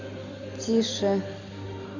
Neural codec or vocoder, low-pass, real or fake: none; 7.2 kHz; real